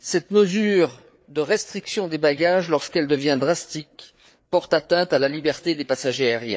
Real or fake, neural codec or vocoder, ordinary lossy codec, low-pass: fake; codec, 16 kHz, 4 kbps, FreqCodec, larger model; none; none